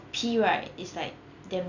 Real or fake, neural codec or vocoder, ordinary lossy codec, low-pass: real; none; none; 7.2 kHz